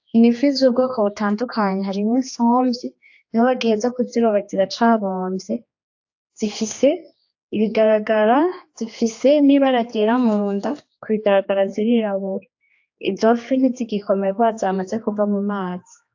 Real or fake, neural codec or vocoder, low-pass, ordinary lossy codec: fake; codec, 16 kHz, 2 kbps, X-Codec, HuBERT features, trained on general audio; 7.2 kHz; AAC, 48 kbps